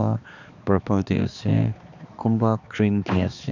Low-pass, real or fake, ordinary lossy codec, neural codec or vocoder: 7.2 kHz; fake; none; codec, 16 kHz, 2 kbps, X-Codec, HuBERT features, trained on balanced general audio